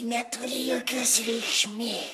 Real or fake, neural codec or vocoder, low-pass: fake; codec, 44.1 kHz, 3.4 kbps, Pupu-Codec; 14.4 kHz